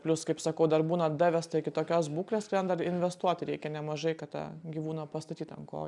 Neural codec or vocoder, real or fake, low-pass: none; real; 10.8 kHz